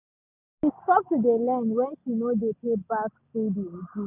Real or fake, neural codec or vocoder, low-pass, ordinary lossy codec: real; none; 3.6 kHz; none